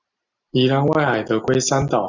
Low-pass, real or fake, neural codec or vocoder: 7.2 kHz; real; none